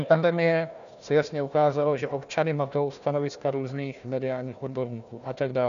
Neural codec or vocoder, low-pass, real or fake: codec, 16 kHz, 1 kbps, FunCodec, trained on Chinese and English, 50 frames a second; 7.2 kHz; fake